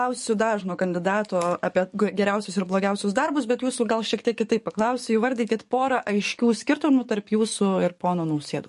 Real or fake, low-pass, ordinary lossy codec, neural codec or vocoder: fake; 14.4 kHz; MP3, 48 kbps; codec, 44.1 kHz, 7.8 kbps, DAC